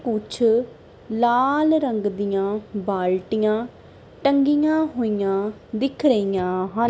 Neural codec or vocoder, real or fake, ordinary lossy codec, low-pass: none; real; none; none